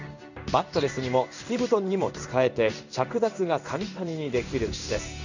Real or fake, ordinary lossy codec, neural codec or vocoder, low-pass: fake; none; codec, 16 kHz in and 24 kHz out, 1 kbps, XY-Tokenizer; 7.2 kHz